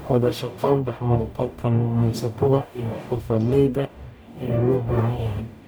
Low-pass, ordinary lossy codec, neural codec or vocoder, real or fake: none; none; codec, 44.1 kHz, 0.9 kbps, DAC; fake